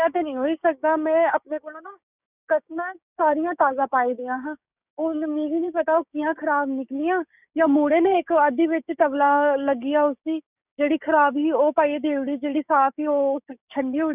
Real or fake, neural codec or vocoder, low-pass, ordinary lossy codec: fake; codec, 16 kHz, 6 kbps, DAC; 3.6 kHz; none